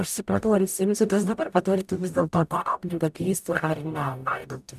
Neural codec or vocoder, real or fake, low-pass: codec, 44.1 kHz, 0.9 kbps, DAC; fake; 14.4 kHz